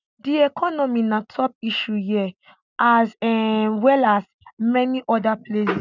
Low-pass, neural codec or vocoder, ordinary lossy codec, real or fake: 7.2 kHz; none; none; real